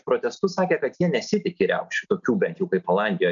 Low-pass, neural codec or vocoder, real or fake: 7.2 kHz; none; real